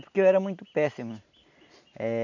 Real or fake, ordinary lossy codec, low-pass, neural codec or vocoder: real; none; 7.2 kHz; none